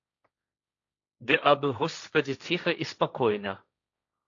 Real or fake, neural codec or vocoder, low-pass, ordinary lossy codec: fake; codec, 16 kHz, 1.1 kbps, Voila-Tokenizer; 7.2 kHz; AAC, 48 kbps